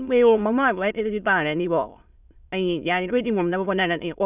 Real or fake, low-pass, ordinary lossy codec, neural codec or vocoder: fake; 3.6 kHz; none; autoencoder, 22.05 kHz, a latent of 192 numbers a frame, VITS, trained on many speakers